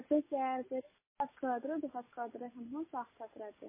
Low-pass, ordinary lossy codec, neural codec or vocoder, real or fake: 3.6 kHz; MP3, 16 kbps; none; real